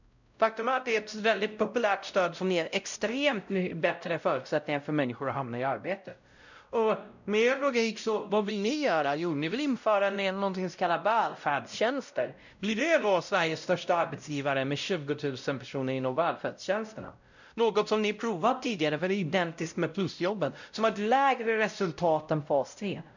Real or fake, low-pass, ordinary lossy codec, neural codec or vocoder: fake; 7.2 kHz; none; codec, 16 kHz, 0.5 kbps, X-Codec, WavLM features, trained on Multilingual LibriSpeech